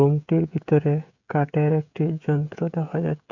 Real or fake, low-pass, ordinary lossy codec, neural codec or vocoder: fake; 7.2 kHz; none; codec, 16 kHz, 16 kbps, FreqCodec, smaller model